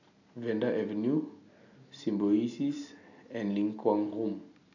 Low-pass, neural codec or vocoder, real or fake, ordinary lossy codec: 7.2 kHz; none; real; none